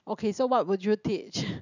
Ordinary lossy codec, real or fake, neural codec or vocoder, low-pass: none; real; none; 7.2 kHz